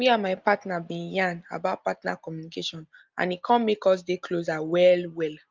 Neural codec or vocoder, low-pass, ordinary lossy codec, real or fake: none; 7.2 kHz; Opus, 24 kbps; real